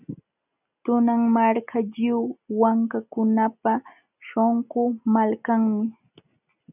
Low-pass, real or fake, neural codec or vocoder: 3.6 kHz; real; none